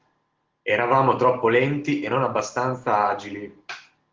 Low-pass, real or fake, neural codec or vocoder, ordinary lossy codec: 7.2 kHz; real; none; Opus, 16 kbps